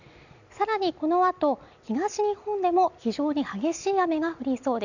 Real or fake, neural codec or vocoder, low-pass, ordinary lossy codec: real; none; 7.2 kHz; none